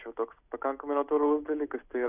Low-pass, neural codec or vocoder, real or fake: 3.6 kHz; none; real